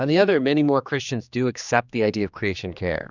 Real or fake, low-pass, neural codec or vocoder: fake; 7.2 kHz; codec, 16 kHz, 2 kbps, X-Codec, HuBERT features, trained on general audio